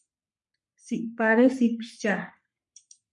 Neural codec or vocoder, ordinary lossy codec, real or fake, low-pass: codec, 44.1 kHz, 3.4 kbps, Pupu-Codec; MP3, 64 kbps; fake; 10.8 kHz